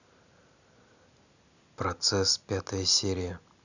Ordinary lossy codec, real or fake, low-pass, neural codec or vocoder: none; real; 7.2 kHz; none